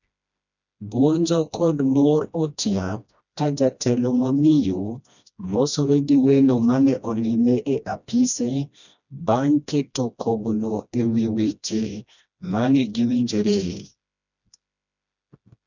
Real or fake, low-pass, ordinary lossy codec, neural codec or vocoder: fake; 7.2 kHz; none; codec, 16 kHz, 1 kbps, FreqCodec, smaller model